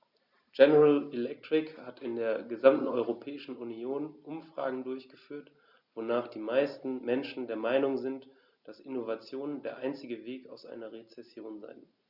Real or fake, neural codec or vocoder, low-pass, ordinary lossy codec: real; none; 5.4 kHz; Opus, 64 kbps